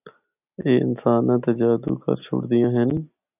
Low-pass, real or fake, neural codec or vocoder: 3.6 kHz; real; none